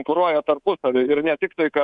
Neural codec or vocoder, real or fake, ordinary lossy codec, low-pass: codec, 24 kHz, 3.1 kbps, DualCodec; fake; Opus, 64 kbps; 10.8 kHz